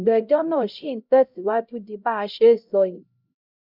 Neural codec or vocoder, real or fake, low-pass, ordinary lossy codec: codec, 16 kHz, 0.5 kbps, X-Codec, HuBERT features, trained on LibriSpeech; fake; 5.4 kHz; none